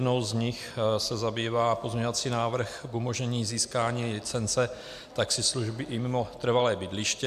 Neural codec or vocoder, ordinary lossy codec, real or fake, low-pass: none; AAC, 96 kbps; real; 14.4 kHz